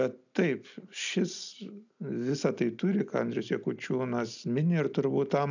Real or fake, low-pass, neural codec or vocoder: real; 7.2 kHz; none